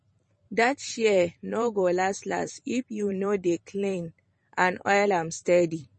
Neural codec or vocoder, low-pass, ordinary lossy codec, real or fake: vocoder, 44.1 kHz, 128 mel bands every 512 samples, BigVGAN v2; 10.8 kHz; MP3, 32 kbps; fake